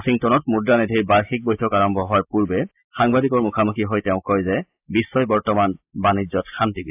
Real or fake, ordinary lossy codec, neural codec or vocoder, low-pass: real; AAC, 32 kbps; none; 3.6 kHz